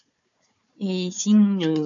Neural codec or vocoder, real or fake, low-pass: codec, 16 kHz, 4 kbps, FunCodec, trained on Chinese and English, 50 frames a second; fake; 7.2 kHz